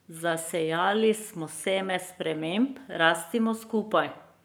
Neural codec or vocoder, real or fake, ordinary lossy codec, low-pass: codec, 44.1 kHz, 7.8 kbps, Pupu-Codec; fake; none; none